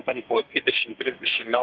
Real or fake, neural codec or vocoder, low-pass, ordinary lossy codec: fake; codec, 32 kHz, 1.9 kbps, SNAC; 7.2 kHz; Opus, 24 kbps